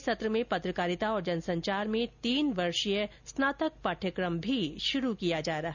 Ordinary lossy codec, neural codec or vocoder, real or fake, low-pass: none; none; real; 7.2 kHz